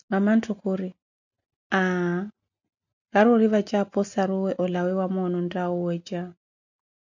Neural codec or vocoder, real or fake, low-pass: none; real; 7.2 kHz